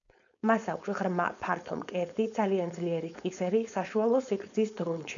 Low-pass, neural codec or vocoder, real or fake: 7.2 kHz; codec, 16 kHz, 4.8 kbps, FACodec; fake